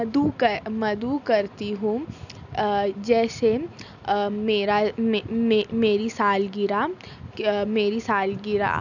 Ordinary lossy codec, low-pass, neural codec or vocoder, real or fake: none; 7.2 kHz; none; real